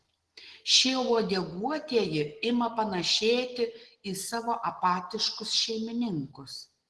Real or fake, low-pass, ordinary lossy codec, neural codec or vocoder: real; 10.8 kHz; Opus, 16 kbps; none